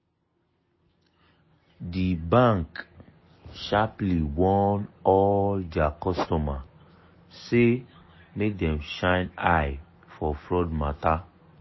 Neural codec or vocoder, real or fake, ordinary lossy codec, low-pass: none; real; MP3, 24 kbps; 7.2 kHz